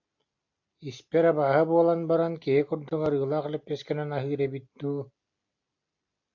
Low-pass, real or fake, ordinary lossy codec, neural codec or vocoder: 7.2 kHz; real; AAC, 48 kbps; none